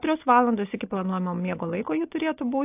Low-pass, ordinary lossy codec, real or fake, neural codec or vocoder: 3.6 kHz; AAC, 24 kbps; real; none